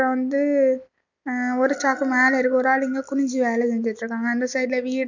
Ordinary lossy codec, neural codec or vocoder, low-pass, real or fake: none; none; 7.2 kHz; real